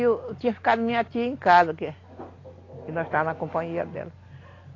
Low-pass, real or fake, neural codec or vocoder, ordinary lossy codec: 7.2 kHz; real; none; AAC, 32 kbps